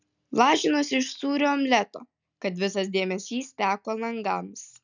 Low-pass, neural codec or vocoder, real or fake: 7.2 kHz; none; real